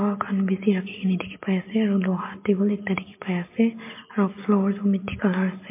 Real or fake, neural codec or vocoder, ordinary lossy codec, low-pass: real; none; MP3, 24 kbps; 3.6 kHz